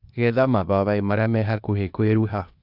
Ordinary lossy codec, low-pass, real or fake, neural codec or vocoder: none; 5.4 kHz; fake; codec, 16 kHz, 0.8 kbps, ZipCodec